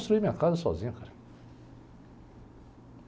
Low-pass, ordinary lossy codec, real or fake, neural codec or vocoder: none; none; real; none